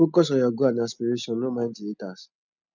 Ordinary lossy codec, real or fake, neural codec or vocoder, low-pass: none; real; none; 7.2 kHz